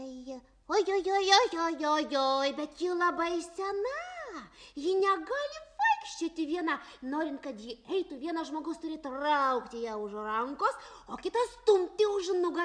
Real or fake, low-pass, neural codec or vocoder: real; 9.9 kHz; none